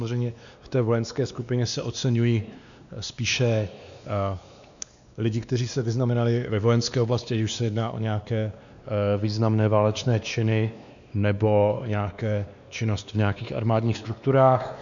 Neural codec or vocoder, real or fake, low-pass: codec, 16 kHz, 2 kbps, X-Codec, WavLM features, trained on Multilingual LibriSpeech; fake; 7.2 kHz